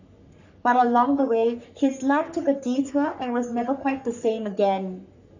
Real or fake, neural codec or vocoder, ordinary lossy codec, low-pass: fake; codec, 44.1 kHz, 3.4 kbps, Pupu-Codec; none; 7.2 kHz